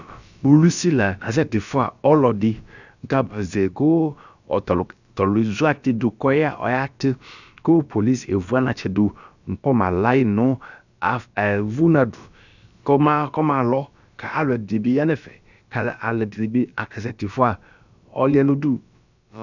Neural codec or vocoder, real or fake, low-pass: codec, 16 kHz, about 1 kbps, DyCAST, with the encoder's durations; fake; 7.2 kHz